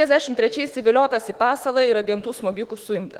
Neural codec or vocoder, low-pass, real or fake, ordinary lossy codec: autoencoder, 48 kHz, 32 numbers a frame, DAC-VAE, trained on Japanese speech; 19.8 kHz; fake; Opus, 16 kbps